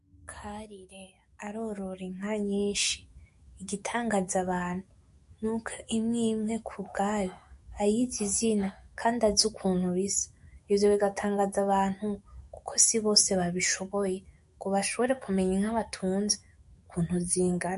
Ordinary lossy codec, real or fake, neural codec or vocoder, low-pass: MP3, 48 kbps; fake; autoencoder, 48 kHz, 128 numbers a frame, DAC-VAE, trained on Japanese speech; 14.4 kHz